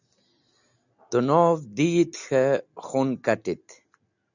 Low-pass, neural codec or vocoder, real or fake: 7.2 kHz; none; real